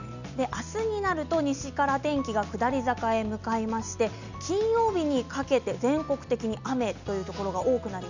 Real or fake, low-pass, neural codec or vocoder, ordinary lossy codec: real; 7.2 kHz; none; none